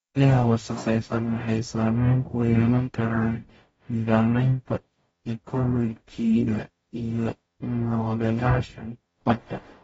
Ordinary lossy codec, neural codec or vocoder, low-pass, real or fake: AAC, 24 kbps; codec, 44.1 kHz, 0.9 kbps, DAC; 19.8 kHz; fake